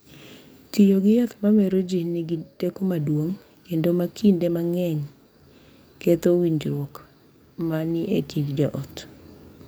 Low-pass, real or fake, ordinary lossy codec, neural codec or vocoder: none; fake; none; codec, 44.1 kHz, 7.8 kbps, DAC